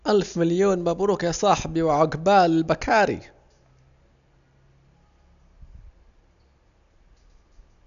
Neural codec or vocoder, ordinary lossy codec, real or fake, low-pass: none; none; real; 7.2 kHz